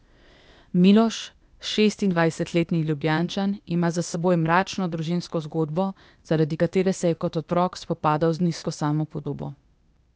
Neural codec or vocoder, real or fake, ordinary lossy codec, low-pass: codec, 16 kHz, 0.8 kbps, ZipCodec; fake; none; none